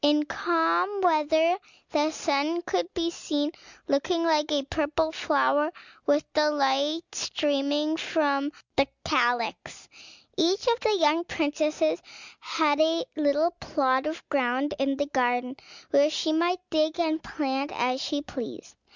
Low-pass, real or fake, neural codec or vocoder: 7.2 kHz; real; none